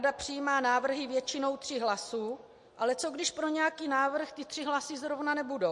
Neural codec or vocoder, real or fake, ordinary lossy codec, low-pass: none; real; MP3, 48 kbps; 10.8 kHz